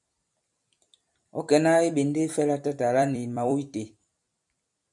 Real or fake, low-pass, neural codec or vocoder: fake; 10.8 kHz; vocoder, 44.1 kHz, 128 mel bands every 256 samples, BigVGAN v2